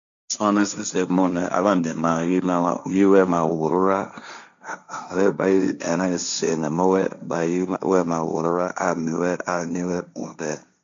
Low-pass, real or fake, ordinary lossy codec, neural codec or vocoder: 7.2 kHz; fake; MP3, 64 kbps; codec, 16 kHz, 1.1 kbps, Voila-Tokenizer